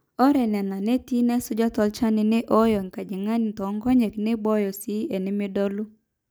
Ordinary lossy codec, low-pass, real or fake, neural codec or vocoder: none; none; real; none